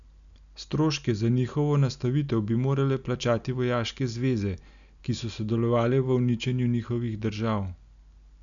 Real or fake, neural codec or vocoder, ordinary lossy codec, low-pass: real; none; none; 7.2 kHz